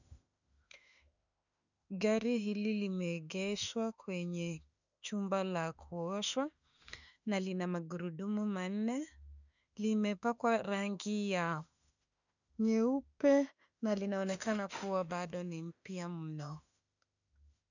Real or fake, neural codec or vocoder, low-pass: fake; autoencoder, 48 kHz, 32 numbers a frame, DAC-VAE, trained on Japanese speech; 7.2 kHz